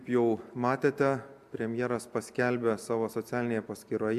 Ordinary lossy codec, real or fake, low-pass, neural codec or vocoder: MP3, 96 kbps; real; 14.4 kHz; none